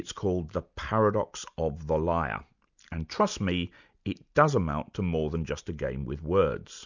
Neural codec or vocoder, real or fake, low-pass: none; real; 7.2 kHz